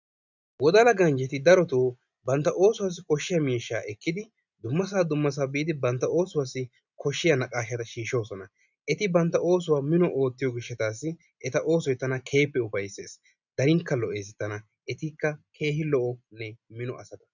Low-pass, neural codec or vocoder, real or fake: 7.2 kHz; none; real